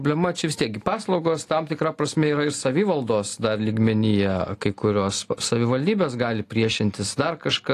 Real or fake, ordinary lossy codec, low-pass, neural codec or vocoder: real; AAC, 48 kbps; 14.4 kHz; none